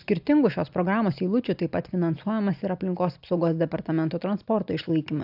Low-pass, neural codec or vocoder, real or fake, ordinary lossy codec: 5.4 kHz; none; real; AAC, 48 kbps